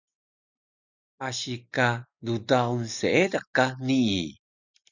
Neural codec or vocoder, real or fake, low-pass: none; real; 7.2 kHz